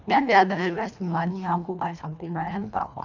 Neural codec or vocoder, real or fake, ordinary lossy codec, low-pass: codec, 24 kHz, 1.5 kbps, HILCodec; fake; none; 7.2 kHz